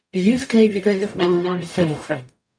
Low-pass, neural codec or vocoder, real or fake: 9.9 kHz; codec, 44.1 kHz, 0.9 kbps, DAC; fake